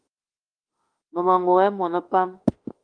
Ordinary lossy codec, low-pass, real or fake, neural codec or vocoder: Opus, 24 kbps; 9.9 kHz; fake; autoencoder, 48 kHz, 32 numbers a frame, DAC-VAE, trained on Japanese speech